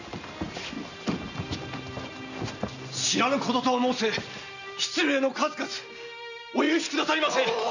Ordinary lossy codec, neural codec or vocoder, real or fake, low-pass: none; none; real; 7.2 kHz